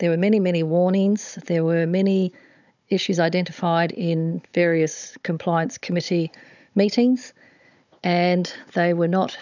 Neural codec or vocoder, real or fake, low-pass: codec, 16 kHz, 16 kbps, FunCodec, trained on Chinese and English, 50 frames a second; fake; 7.2 kHz